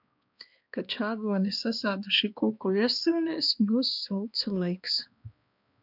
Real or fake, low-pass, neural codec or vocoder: fake; 5.4 kHz; codec, 16 kHz, 2 kbps, X-Codec, HuBERT features, trained on balanced general audio